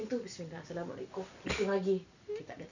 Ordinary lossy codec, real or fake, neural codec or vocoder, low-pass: none; real; none; 7.2 kHz